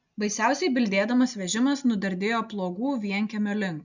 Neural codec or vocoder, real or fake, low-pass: none; real; 7.2 kHz